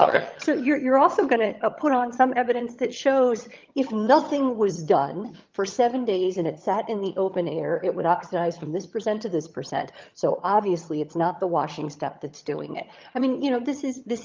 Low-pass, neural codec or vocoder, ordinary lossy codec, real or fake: 7.2 kHz; vocoder, 22.05 kHz, 80 mel bands, HiFi-GAN; Opus, 24 kbps; fake